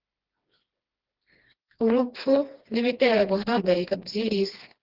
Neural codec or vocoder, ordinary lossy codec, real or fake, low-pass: codec, 16 kHz, 2 kbps, FreqCodec, smaller model; Opus, 16 kbps; fake; 5.4 kHz